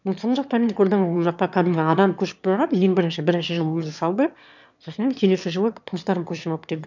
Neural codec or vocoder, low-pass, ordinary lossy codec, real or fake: autoencoder, 22.05 kHz, a latent of 192 numbers a frame, VITS, trained on one speaker; 7.2 kHz; none; fake